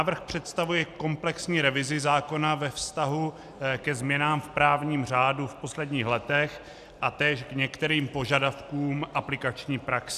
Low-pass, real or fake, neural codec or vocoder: 14.4 kHz; real; none